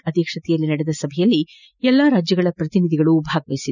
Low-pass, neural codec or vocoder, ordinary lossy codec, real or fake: 7.2 kHz; none; none; real